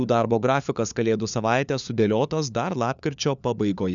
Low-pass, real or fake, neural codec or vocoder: 7.2 kHz; fake; codec, 16 kHz, 4 kbps, FunCodec, trained on LibriTTS, 50 frames a second